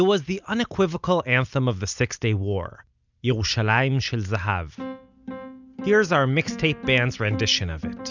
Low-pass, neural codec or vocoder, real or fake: 7.2 kHz; none; real